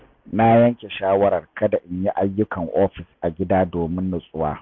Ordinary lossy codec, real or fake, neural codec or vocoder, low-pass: MP3, 64 kbps; real; none; 7.2 kHz